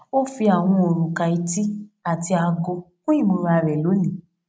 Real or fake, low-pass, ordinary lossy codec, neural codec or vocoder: real; none; none; none